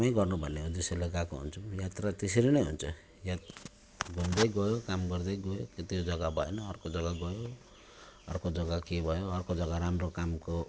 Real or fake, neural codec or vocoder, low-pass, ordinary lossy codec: real; none; none; none